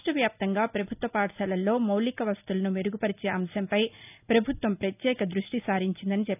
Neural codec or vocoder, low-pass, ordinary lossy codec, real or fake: none; 3.6 kHz; none; real